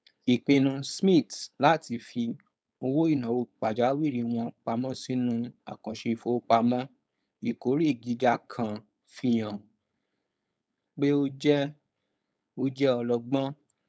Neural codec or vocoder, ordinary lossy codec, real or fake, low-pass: codec, 16 kHz, 4.8 kbps, FACodec; none; fake; none